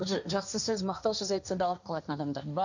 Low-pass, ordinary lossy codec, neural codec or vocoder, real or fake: 7.2 kHz; none; codec, 16 kHz, 1.1 kbps, Voila-Tokenizer; fake